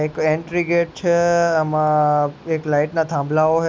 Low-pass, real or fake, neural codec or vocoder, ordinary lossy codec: none; real; none; none